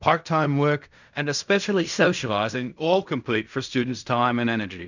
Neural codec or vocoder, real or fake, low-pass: codec, 16 kHz in and 24 kHz out, 0.4 kbps, LongCat-Audio-Codec, fine tuned four codebook decoder; fake; 7.2 kHz